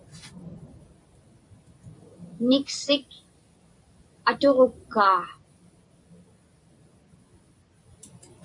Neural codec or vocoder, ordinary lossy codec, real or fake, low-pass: vocoder, 44.1 kHz, 128 mel bands every 256 samples, BigVGAN v2; AAC, 64 kbps; fake; 10.8 kHz